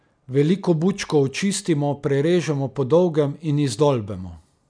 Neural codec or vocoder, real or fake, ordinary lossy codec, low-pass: none; real; none; 9.9 kHz